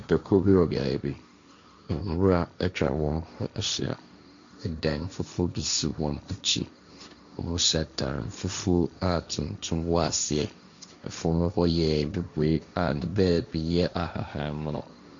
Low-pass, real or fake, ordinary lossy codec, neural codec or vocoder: 7.2 kHz; fake; MP3, 64 kbps; codec, 16 kHz, 1.1 kbps, Voila-Tokenizer